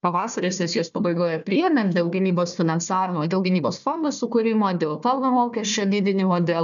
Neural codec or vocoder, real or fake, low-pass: codec, 16 kHz, 1 kbps, FunCodec, trained on Chinese and English, 50 frames a second; fake; 7.2 kHz